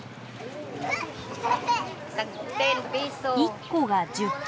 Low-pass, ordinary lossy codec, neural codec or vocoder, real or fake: none; none; none; real